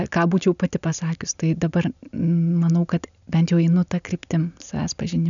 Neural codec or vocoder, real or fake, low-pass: none; real; 7.2 kHz